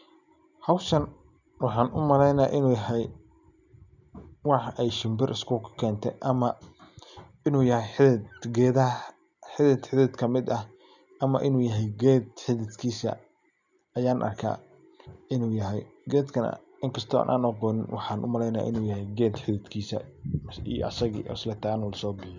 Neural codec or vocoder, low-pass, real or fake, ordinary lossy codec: none; 7.2 kHz; real; none